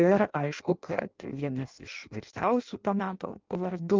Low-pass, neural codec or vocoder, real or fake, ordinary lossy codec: 7.2 kHz; codec, 16 kHz in and 24 kHz out, 0.6 kbps, FireRedTTS-2 codec; fake; Opus, 32 kbps